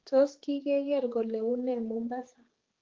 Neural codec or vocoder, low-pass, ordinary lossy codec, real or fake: codec, 16 kHz, 4 kbps, X-Codec, HuBERT features, trained on general audio; 7.2 kHz; Opus, 16 kbps; fake